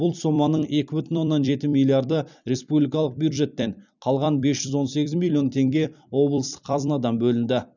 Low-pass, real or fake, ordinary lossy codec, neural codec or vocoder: 7.2 kHz; fake; none; vocoder, 44.1 kHz, 128 mel bands every 256 samples, BigVGAN v2